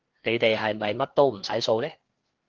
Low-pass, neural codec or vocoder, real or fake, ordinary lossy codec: 7.2 kHz; codec, 16 kHz, 2 kbps, FreqCodec, larger model; fake; Opus, 16 kbps